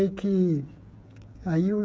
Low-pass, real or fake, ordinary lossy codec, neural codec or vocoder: none; fake; none; codec, 16 kHz, 16 kbps, FreqCodec, smaller model